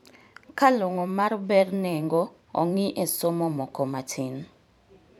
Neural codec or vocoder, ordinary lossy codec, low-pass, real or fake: vocoder, 44.1 kHz, 128 mel bands every 512 samples, BigVGAN v2; none; 19.8 kHz; fake